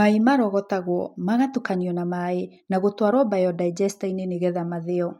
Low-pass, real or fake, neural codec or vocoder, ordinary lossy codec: 19.8 kHz; real; none; MP3, 64 kbps